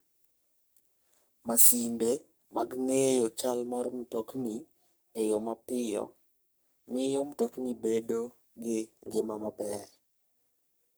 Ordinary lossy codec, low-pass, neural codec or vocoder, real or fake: none; none; codec, 44.1 kHz, 3.4 kbps, Pupu-Codec; fake